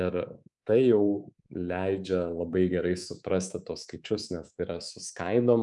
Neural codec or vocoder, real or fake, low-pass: codec, 24 kHz, 3.1 kbps, DualCodec; fake; 10.8 kHz